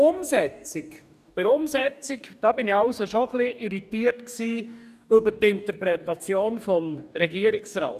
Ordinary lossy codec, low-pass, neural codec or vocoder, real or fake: none; 14.4 kHz; codec, 44.1 kHz, 2.6 kbps, DAC; fake